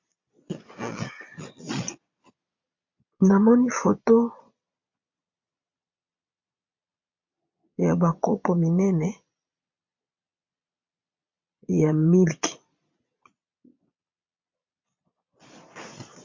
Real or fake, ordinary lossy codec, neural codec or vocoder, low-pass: fake; MP3, 48 kbps; vocoder, 22.05 kHz, 80 mel bands, Vocos; 7.2 kHz